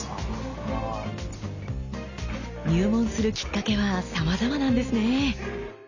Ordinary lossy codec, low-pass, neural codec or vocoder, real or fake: none; 7.2 kHz; none; real